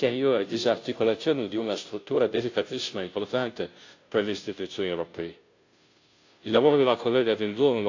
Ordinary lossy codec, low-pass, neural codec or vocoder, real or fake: AAC, 48 kbps; 7.2 kHz; codec, 16 kHz, 0.5 kbps, FunCodec, trained on Chinese and English, 25 frames a second; fake